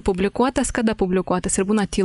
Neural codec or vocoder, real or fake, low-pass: none; real; 10.8 kHz